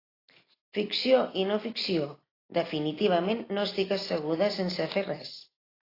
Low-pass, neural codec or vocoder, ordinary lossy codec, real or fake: 5.4 kHz; none; AAC, 24 kbps; real